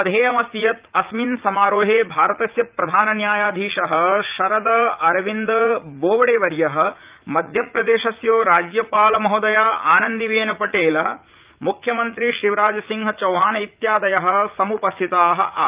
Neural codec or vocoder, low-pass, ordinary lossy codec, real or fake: vocoder, 44.1 kHz, 80 mel bands, Vocos; 3.6 kHz; Opus, 32 kbps; fake